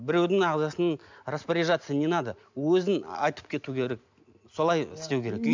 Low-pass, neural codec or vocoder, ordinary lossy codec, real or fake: 7.2 kHz; none; MP3, 64 kbps; real